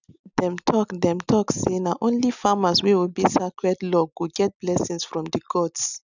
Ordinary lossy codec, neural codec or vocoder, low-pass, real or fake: none; none; 7.2 kHz; real